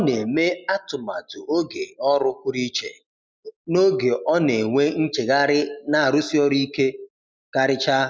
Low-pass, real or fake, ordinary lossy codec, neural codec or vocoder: none; real; none; none